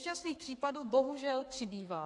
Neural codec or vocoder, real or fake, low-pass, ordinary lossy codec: codec, 32 kHz, 1.9 kbps, SNAC; fake; 10.8 kHz; AAC, 48 kbps